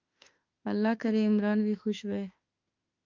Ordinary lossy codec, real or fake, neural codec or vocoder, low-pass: Opus, 24 kbps; fake; autoencoder, 48 kHz, 32 numbers a frame, DAC-VAE, trained on Japanese speech; 7.2 kHz